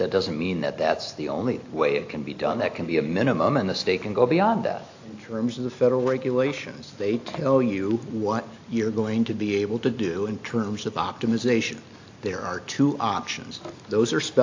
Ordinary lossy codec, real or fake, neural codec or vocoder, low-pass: AAC, 48 kbps; real; none; 7.2 kHz